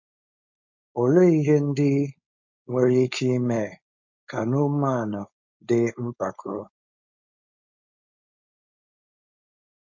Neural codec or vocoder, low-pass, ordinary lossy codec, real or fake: codec, 16 kHz, 4.8 kbps, FACodec; 7.2 kHz; MP3, 64 kbps; fake